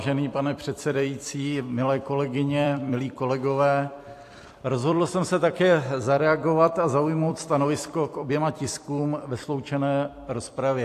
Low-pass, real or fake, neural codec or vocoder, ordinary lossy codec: 14.4 kHz; fake; vocoder, 44.1 kHz, 128 mel bands every 256 samples, BigVGAN v2; AAC, 64 kbps